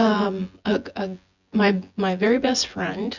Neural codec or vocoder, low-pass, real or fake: vocoder, 24 kHz, 100 mel bands, Vocos; 7.2 kHz; fake